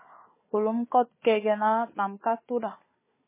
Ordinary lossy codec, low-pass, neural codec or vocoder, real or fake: MP3, 16 kbps; 3.6 kHz; codec, 16 kHz, 4 kbps, FunCodec, trained on Chinese and English, 50 frames a second; fake